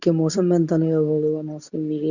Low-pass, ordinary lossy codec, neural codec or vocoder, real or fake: 7.2 kHz; none; codec, 24 kHz, 0.9 kbps, WavTokenizer, medium speech release version 2; fake